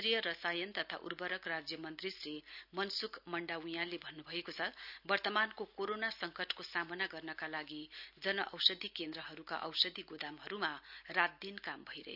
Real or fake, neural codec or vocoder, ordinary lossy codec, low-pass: real; none; none; 5.4 kHz